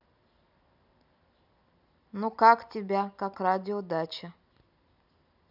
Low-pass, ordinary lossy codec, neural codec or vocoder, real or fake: 5.4 kHz; none; none; real